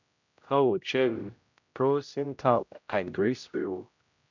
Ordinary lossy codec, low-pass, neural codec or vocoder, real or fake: none; 7.2 kHz; codec, 16 kHz, 0.5 kbps, X-Codec, HuBERT features, trained on general audio; fake